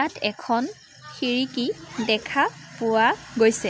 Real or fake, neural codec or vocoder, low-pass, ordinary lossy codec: real; none; none; none